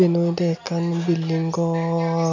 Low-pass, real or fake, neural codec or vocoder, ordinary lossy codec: 7.2 kHz; real; none; MP3, 48 kbps